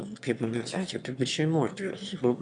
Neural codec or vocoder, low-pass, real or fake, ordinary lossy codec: autoencoder, 22.05 kHz, a latent of 192 numbers a frame, VITS, trained on one speaker; 9.9 kHz; fake; AAC, 64 kbps